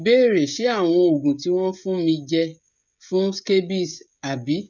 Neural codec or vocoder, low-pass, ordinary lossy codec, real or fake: codec, 16 kHz, 16 kbps, FreqCodec, smaller model; 7.2 kHz; none; fake